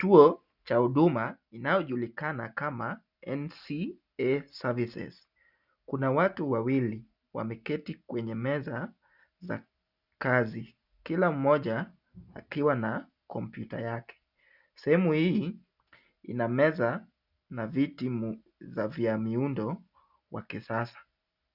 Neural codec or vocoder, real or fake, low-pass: none; real; 5.4 kHz